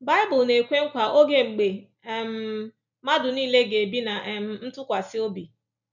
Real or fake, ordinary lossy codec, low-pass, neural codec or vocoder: real; none; 7.2 kHz; none